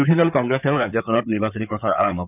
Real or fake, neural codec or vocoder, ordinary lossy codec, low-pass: fake; codec, 16 kHz in and 24 kHz out, 2.2 kbps, FireRedTTS-2 codec; none; 3.6 kHz